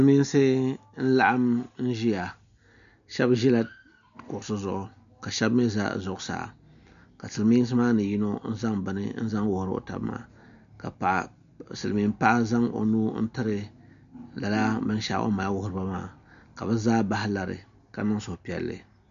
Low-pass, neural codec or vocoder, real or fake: 7.2 kHz; none; real